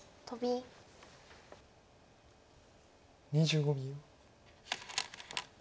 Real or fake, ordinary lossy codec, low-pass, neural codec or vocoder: real; none; none; none